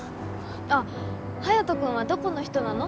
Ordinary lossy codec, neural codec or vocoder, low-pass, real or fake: none; none; none; real